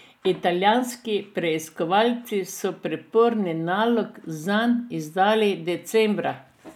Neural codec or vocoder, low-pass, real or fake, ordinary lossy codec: none; 19.8 kHz; real; none